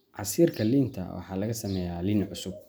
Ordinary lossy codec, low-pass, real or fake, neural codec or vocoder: none; none; real; none